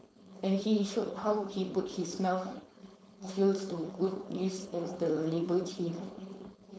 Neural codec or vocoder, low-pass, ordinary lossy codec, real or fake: codec, 16 kHz, 4.8 kbps, FACodec; none; none; fake